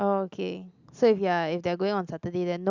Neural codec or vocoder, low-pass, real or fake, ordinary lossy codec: none; 7.2 kHz; real; Opus, 64 kbps